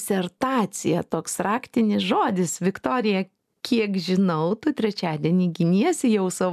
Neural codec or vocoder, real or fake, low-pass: none; real; 14.4 kHz